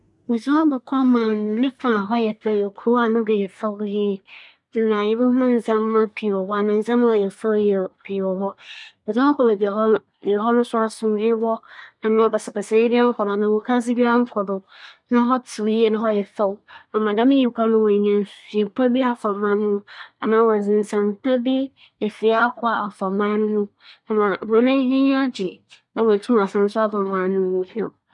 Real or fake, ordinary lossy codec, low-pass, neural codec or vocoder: fake; none; 10.8 kHz; codec, 24 kHz, 1 kbps, SNAC